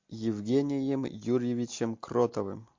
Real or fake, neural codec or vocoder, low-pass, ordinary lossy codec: real; none; 7.2 kHz; MP3, 48 kbps